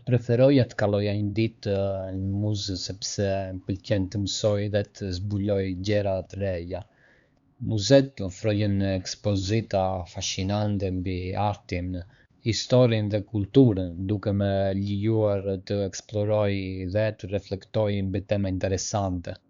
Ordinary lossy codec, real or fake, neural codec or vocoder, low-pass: Opus, 64 kbps; fake; codec, 16 kHz, 4 kbps, X-Codec, HuBERT features, trained on LibriSpeech; 7.2 kHz